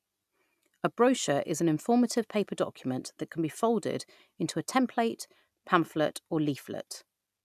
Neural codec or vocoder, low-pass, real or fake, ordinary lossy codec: none; 14.4 kHz; real; none